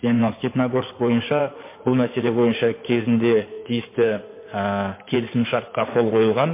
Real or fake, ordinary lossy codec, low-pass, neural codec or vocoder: fake; MP3, 24 kbps; 3.6 kHz; codec, 16 kHz in and 24 kHz out, 2.2 kbps, FireRedTTS-2 codec